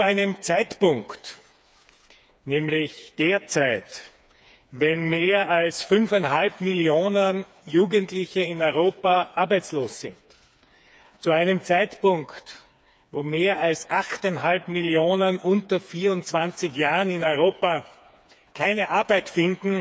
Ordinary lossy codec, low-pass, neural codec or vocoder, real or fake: none; none; codec, 16 kHz, 4 kbps, FreqCodec, smaller model; fake